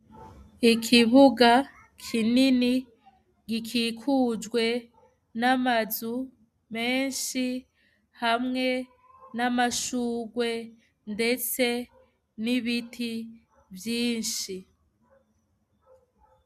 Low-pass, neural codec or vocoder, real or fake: 14.4 kHz; none; real